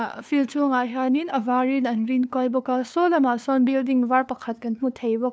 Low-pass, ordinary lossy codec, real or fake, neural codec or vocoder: none; none; fake; codec, 16 kHz, 2 kbps, FunCodec, trained on LibriTTS, 25 frames a second